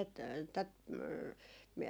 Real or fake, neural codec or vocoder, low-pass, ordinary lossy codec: real; none; none; none